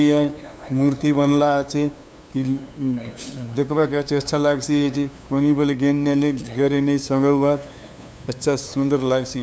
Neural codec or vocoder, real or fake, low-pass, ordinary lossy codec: codec, 16 kHz, 2 kbps, FunCodec, trained on LibriTTS, 25 frames a second; fake; none; none